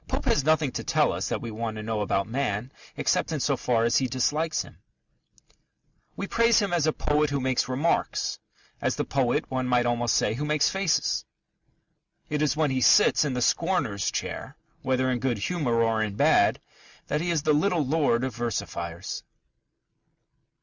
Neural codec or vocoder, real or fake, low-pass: none; real; 7.2 kHz